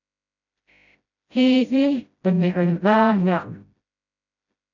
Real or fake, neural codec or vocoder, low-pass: fake; codec, 16 kHz, 0.5 kbps, FreqCodec, smaller model; 7.2 kHz